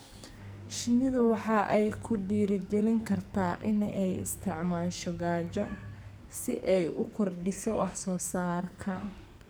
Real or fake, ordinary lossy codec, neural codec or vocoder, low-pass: fake; none; codec, 44.1 kHz, 2.6 kbps, SNAC; none